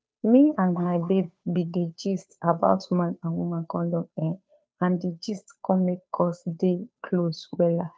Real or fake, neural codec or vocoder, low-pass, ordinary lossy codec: fake; codec, 16 kHz, 2 kbps, FunCodec, trained on Chinese and English, 25 frames a second; none; none